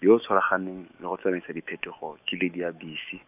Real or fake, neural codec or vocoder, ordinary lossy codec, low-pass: fake; autoencoder, 48 kHz, 128 numbers a frame, DAC-VAE, trained on Japanese speech; none; 3.6 kHz